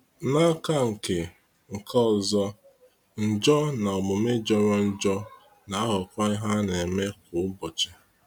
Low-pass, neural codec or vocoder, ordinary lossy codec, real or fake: 19.8 kHz; none; none; real